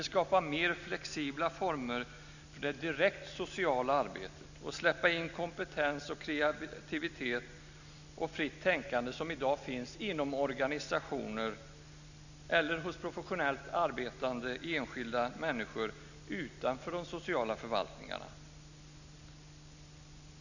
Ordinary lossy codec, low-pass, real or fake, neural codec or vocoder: none; 7.2 kHz; real; none